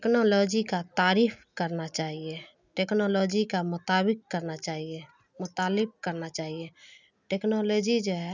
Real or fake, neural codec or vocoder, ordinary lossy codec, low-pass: real; none; none; 7.2 kHz